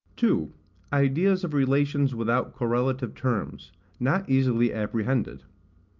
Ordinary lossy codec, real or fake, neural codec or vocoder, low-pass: Opus, 24 kbps; real; none; 7.2 kHz